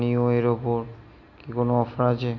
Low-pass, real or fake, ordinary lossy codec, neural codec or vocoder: 7.2 kHz; real; none; none